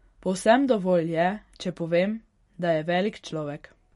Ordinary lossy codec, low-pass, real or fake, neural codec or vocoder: MP3, 48 kbps; 19.8 kHz; real; none